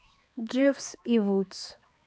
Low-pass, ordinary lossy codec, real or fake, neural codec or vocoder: none; none; fake; codec, 16 kHz, 2 kbps, X-Codec, HuBERT features, trained on balanced general audio